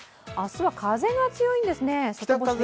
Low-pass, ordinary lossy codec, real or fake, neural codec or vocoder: none; none; real; none